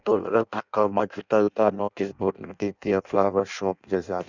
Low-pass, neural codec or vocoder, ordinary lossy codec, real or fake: 7.2 kHz; codec, 16 kHz in and 24 kHz out, 0.6 kbps, FireRedTTS-2 codec; none; fake